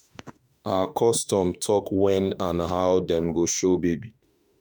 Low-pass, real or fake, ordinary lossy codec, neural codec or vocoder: none; fake; none; autoencoder, 48 kHz, 32 numbers a frame, DAC-VAE, trained on Japanese speech